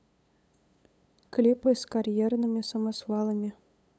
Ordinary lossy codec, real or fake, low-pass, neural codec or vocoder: none; fake; none; codec, 16 kHz, 8 kbps, FunCodec, trained on LibriTTS, 25 frames a second